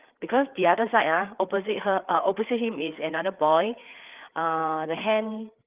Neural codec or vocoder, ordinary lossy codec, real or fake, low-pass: codec, 16 kHz, 4 kbps, FreqCodec, larger model; Opus, 32 kbps; fake; 3.6 kHz